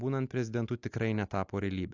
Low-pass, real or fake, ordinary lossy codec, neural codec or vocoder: 7.2 kHz; real; AAC, 48 kbps; none